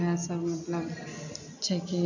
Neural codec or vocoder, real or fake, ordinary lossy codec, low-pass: none; real; none; 7.2 kHz